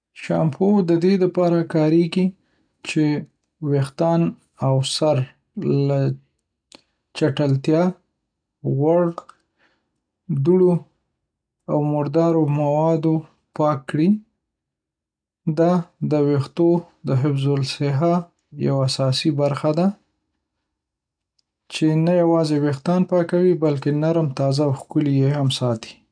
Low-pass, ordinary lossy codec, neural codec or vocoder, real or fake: 9.9 kHz; none; none; real